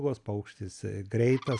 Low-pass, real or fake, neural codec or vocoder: 10.8 kHz; real; none